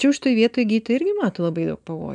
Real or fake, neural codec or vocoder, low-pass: real; none; 9.9 kHz